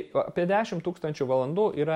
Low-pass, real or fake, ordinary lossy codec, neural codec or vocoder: 19.8 kHz; real; MP3, 64 kbps; none